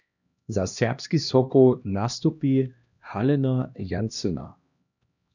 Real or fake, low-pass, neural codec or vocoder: fake; 7.2 kHz; codec, 16 kHz, 1 kbps, X-Codec, HuBERT features, trained on LibriSpeech